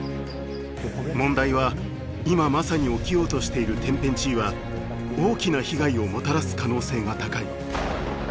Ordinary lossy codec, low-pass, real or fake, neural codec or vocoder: none; none; real; none